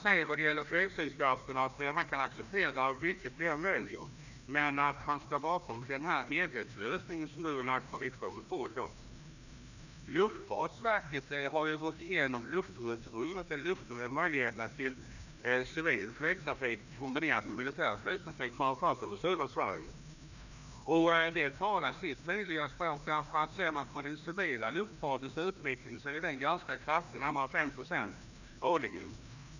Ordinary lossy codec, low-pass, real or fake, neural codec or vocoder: none; 7.2 kHz; fake; codec, 16 kHz, 1 kbps, FreqCodec, larger model